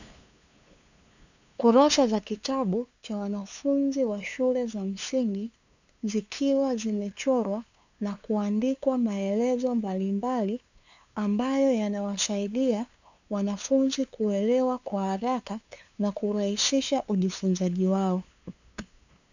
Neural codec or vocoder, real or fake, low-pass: codec, 16 kHz, 2 kbps, FunCodec, trained on LibriTTS, 25 frames a second; fake; 7.2 kHz